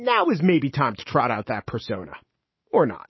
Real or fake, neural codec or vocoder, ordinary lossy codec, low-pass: fake; autoencoder, 48 kHz, 128 numbers a frame, DAC-VAE, trained on Japanese speech; MP3, 24 kbps; 7.2 kHz